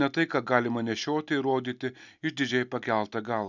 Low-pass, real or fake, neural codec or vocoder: 7.2 kHz; real; none